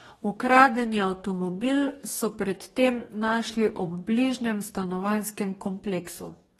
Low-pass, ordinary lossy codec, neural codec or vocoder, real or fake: 19.8 kHz; AAC, 32 kbps; codec, 44.1 kHz, 2.6 kbps, DAC; fake